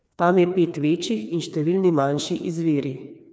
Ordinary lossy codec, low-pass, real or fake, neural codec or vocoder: none; none; fake; codec, 16 kHz, 2 kbps, FreqCodec, larger model